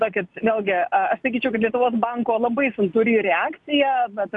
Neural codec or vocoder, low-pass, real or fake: none; 10.8 kHz; real